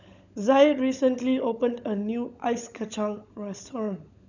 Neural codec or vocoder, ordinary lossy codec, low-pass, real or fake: codec, 16 kHz, 16 kbps, FunCodec, trained on LibriTTS, 50 frames a second; none; 7.2 kHz; fake